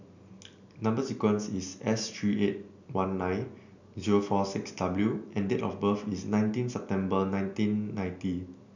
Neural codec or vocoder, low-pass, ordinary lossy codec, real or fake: none; 7.2 kHz; none; real